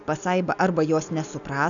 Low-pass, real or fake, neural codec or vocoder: 7.2 kHz; real; none